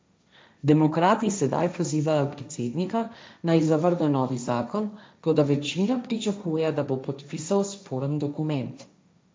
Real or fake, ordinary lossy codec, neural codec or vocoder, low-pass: fake; none; codec, 16 kHz, 1.1 kbps, Voila-Tokenizer; none